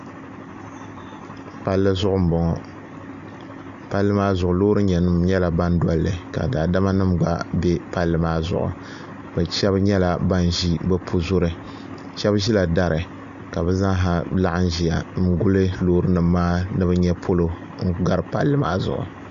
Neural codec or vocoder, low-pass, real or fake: none; 7.2 kHz; real